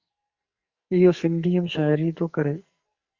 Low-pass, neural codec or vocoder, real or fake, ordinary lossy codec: 7.2 kHz; codec, 44.1 kHz, 2.6 kbps, SNAC; fake; Opus, 64 kbps